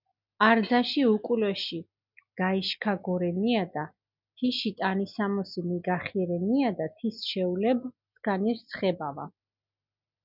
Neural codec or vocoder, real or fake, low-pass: none; real; 5.4 kHz